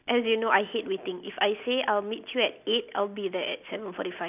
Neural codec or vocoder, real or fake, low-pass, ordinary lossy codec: none; real; 3.6 kHz; none